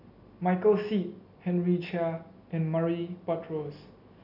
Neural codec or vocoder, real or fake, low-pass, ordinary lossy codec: none; real; 5.4 kHz; none